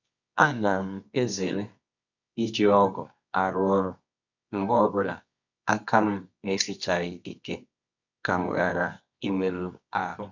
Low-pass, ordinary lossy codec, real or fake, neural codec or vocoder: 7.2 kHz; none; fake; codec, 24 kHz, 0.9 kbps, WavTokenizer, medium music audio release